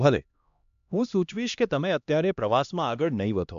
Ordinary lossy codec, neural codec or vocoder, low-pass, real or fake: MP3, 64 kbps; codec, 16 kHz, 1 kbps, X-Codec, HuBERT features, trained on LibriSpeech; 7.2 kHz; fake